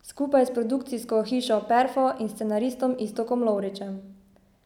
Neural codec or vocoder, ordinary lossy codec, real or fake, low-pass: none; none; real; 19.8 kHz